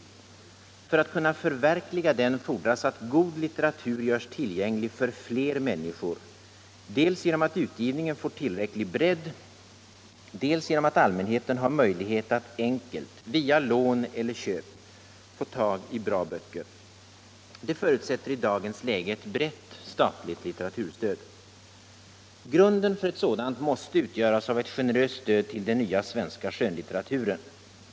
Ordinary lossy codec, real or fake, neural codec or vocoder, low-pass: none; real; none; none